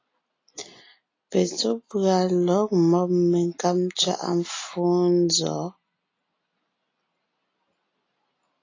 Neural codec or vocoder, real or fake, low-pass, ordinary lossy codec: none; real; 7.2 kHz; AAC, 32 kbps